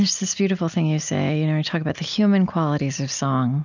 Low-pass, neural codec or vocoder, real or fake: 7.2 kHz; none; real